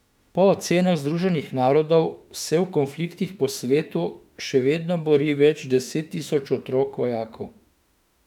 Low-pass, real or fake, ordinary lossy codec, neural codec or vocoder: 19.8 kHz; fake; none; autoencoder, 48 kHz, 32 numbers a frame, DAC-VAE, trained on Japanese speech